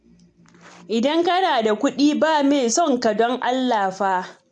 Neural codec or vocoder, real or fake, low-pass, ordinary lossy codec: none; real; 10.8 kHz; AAC, 64 kbps